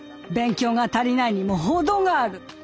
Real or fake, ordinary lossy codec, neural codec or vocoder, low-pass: real; none; none; none